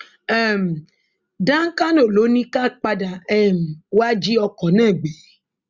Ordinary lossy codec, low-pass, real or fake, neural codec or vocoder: Opus, 64 kbps; 7.2 kHz; real; none